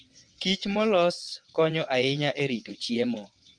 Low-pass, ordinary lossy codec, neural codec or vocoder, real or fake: 9.9 kHz; Opus, 32 kbps; vocoder, 22.05 kHz, 80 mel bands, WaveNeXt; fake